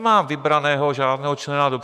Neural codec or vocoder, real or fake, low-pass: autoencoder, 48 kHz, 128 numbers a frame, DAC-VAE, trained on Japanese speech; fake; 14.4 kHz